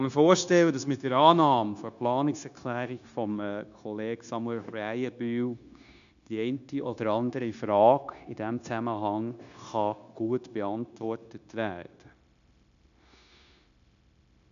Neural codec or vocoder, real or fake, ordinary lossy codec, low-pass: codec, 16 kHz, 0.9 kbps, LongCat-Audio-Codec; fake; none; 7.2 kHz